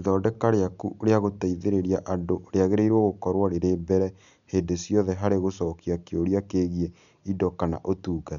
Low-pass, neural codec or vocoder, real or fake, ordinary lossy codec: 7.2 kHz; none; real; none